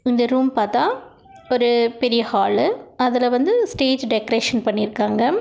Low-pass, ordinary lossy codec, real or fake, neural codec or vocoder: none; none; real; none